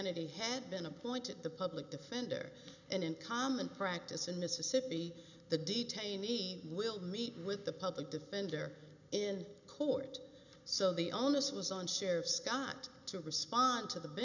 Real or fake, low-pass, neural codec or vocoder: real; 7.2 kHz; none